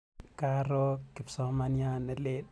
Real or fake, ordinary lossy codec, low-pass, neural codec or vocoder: real; none; none; none